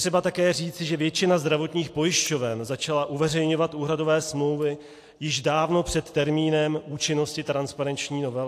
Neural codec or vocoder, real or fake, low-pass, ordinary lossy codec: none; real; 14.4 kHz; AAC, 64 kbps